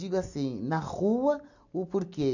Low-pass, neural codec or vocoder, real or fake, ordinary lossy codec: 7.2 kHz; none; real; none